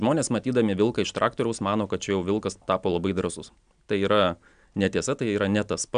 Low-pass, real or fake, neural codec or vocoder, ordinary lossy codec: 9.9 kHz; real; none; MP3, 96 kbps